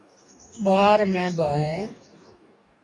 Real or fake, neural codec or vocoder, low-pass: fake; codec, 44.1 kHz, 2.6 kbps, DAC; 10.8 kHz